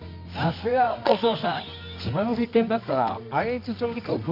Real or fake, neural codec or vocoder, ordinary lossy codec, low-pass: fake; codec, 24 kHz, 0.9 kbps, WavTokenizer, medium music audio release; none; 5.4 kHz